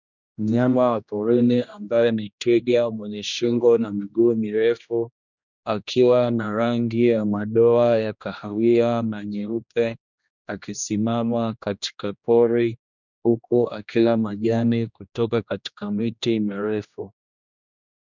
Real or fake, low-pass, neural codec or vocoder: fake; 7.2 kHz; codec, 16 kHz, 1 kbps, X-Codec, HuBERT features, trained on general audio